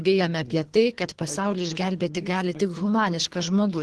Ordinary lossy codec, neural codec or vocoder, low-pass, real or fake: Opus, 16 kbps; codec, 24 kHz, 3 kbps, HILCodec; 10.8 kHz; fake